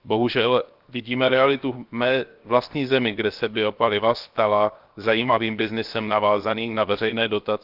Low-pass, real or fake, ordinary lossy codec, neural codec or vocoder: 5.4 kHz; fake; Opus, 24 kbps; codec, 16 kHz, 0.7 kbps, FocalCodec